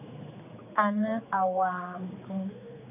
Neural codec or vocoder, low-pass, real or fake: codec, 16 kHz, 4 kbps, X-Codec, HuBERT features, trained on general audio; 3.6 kHz; fake